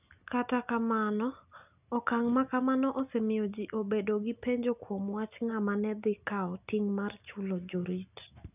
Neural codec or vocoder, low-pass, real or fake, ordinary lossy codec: none; 3.6 kHz; real; none